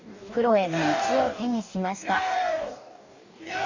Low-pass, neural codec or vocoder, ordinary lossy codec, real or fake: 7.2 kHz; codec, 44.1 kHz, 2.6 kbps, DAC; none; fake